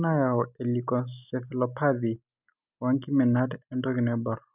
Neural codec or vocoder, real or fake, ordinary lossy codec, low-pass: none; real; none; 3.6 kHz